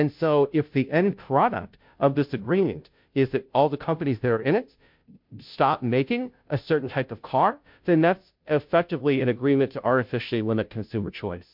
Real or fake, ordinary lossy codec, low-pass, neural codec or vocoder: fake; MP3, 48 kbps; 5.4 kHz; codec, 16 kHz, 0.5 kbps, FunCodec, trained on Chinese and English, 25 frames a second